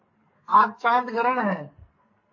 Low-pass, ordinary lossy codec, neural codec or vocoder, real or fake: 7.2 kHz; MP3, 32 kbps; codec, 44.1 kHz, 2.6 kbps, SNAC; fake